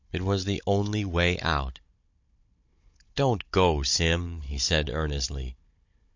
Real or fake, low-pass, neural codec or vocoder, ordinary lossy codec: fake; 7.2 kHz; codec, 16 kHz, 16 kbps, FunCodec, trained on Chinese and English, 50 frames a second; MP3, 48 kbps